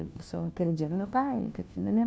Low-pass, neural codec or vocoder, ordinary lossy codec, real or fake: none; codec, 16 kHz, 1 kbps, FunCodec, trained on LibriTTS, 50 frames a second; none; fake